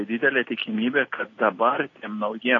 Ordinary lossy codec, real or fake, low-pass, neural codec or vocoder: AAC, 32 kbps; real; 7.2 kHz; none